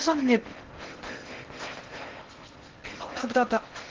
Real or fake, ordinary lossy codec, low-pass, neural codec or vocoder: fake; Opus, 16 kbps; 7.2 kHz; codec, 16 kHz in and 24 kHz out, 0.6 kbps, FocalCodec, streaming, 4096 codes